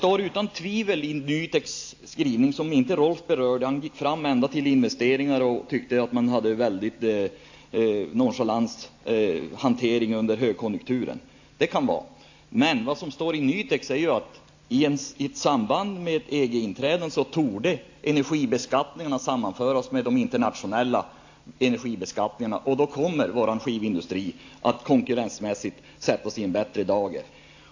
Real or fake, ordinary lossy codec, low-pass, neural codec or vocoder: real; AAC, 48 kbps; 7.2 kHz; none